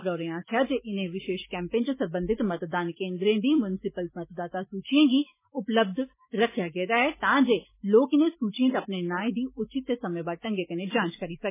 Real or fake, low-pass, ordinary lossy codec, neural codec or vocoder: fake; 3.6 kHz; MP3, 16 kbps; autoencoder, 48 kHz, 128 numbers a frame, DAC-VAE, trained on Japanese speech